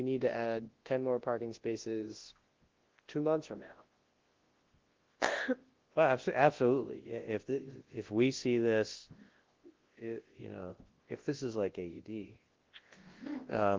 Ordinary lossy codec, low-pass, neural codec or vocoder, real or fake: Opus, 16 kbps; 7.2 kHz; codec, 24 kHz, 0.9 kbps, WavTokenizer, large speech release; fake